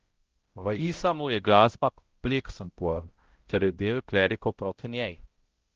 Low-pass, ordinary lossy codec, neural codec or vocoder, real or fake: 7.2 kHz; Opus, 16 kbps; codec, 16 kHz, 0.5 kbps, X-Codec, HuBERT features, trained on balanced general audio; fake